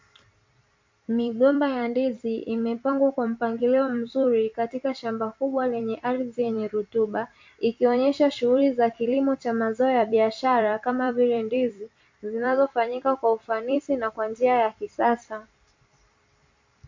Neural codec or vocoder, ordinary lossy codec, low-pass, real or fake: vocoder, 44.1 kHz, 128 mel bands every 256 samples, BigVGAN v2; MP3, 48 kbps; 7.2 kHz; fake